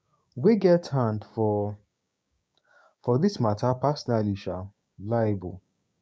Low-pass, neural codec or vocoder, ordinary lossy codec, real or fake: none; codec, 16 kHz, 6 kbps, DAC; none; fake